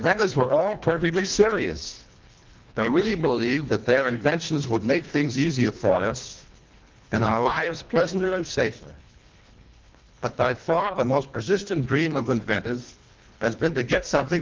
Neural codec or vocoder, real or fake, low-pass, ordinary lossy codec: codec, 24 kHz, 1.5 kbps, HILCodec; fake; 7.2 kHz; Opus, 32 kbps